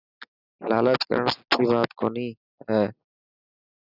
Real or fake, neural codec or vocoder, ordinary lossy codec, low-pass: real; none; Opus, 64 kbps; 5.4 kHz